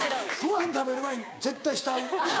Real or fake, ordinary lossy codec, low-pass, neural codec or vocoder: fake; none; none; codec, 16 kHz, 6 kbps, DAC